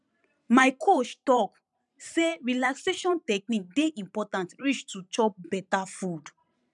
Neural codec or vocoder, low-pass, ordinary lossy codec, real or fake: vocoder, 44.1 kHz, 128 mel bands every 512 samples, BigVGAN v2; 10.8 kHz; none; fake